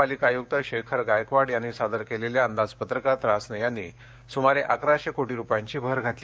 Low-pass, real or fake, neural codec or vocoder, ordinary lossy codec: none; fake; codec, 16 kHz, 6 kbps, DAC; none